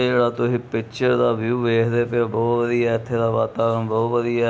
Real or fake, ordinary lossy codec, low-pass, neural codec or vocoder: real; none; none; none